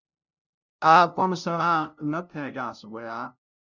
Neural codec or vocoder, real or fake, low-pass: codec, 16 kHz, 0.5 kbps, FunCodec, trained on LibriTTS, 25 frames a second; fake; 7.2 kHz